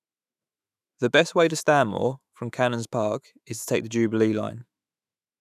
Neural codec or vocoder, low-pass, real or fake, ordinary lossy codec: autoencoder, 48 kHz, 128 numbers a frame, DAC-VAE, trained on Japanese speech; 14.4 kHz; fake; none